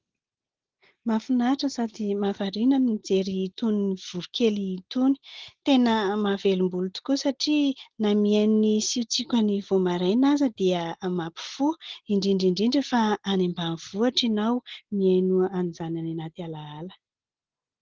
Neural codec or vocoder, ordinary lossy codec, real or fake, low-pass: none; Opus, 16 kbps; real; 7.2 kHz